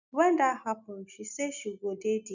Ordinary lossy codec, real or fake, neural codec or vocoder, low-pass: none; real; none; 7.2 kHz